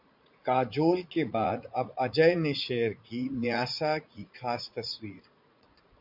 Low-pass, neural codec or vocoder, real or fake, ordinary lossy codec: 5.4 kHz; vocoder, 44.1 kHz, 80 mel bands, Vocos; fake; MP3, 48 kbps